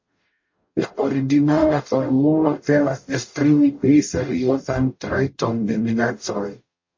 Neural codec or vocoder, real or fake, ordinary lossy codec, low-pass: codec, 44.1 kHz, 0.9 kbps, DAC; fake; MP3, 32 kbps; 7.2 kHz